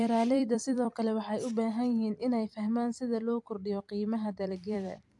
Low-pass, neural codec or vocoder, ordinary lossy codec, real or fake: 10.8 kHz; vocoder, 44.1 kHz, 128 mel bands every 256 samples, BigVGAN v2; AAC, 64 kbps; fake